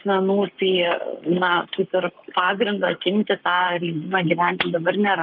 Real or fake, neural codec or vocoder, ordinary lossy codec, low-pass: fake; codec, 44.1 kHz, 7.8 kbps, Pupu-Codec; Opus, 24 kbps; 5.4 kHz